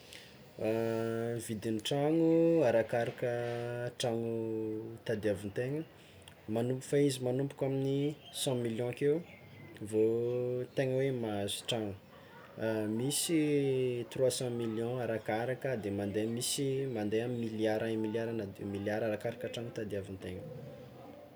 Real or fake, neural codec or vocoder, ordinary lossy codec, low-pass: real; none; none; none